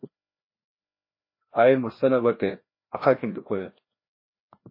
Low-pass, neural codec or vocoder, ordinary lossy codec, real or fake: 5.4 kHz; codec, 16 kHz, 1 kbps, FreqCodec, larger model; MP3, 24 kbps; fake